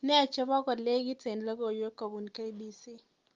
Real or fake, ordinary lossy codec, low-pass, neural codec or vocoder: real; Opus, 32 kbps; 7.2 kHz; none